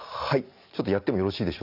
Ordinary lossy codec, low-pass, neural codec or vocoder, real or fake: none; 5.4 kHz; none; real